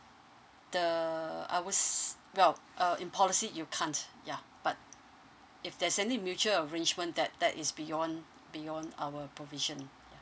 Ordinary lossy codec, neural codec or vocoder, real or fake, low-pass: none; none; real; none